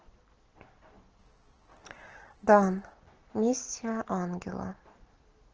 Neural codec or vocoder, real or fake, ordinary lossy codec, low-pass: none; real; Opus, 16 kbps; 7.2 kHz